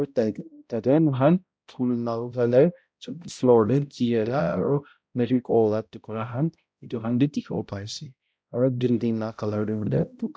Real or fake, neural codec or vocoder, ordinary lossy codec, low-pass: fake; codec, 16 kHz, 0.5 kbps, X-Codec, HuBERT features, trained on balanced general audio; none; none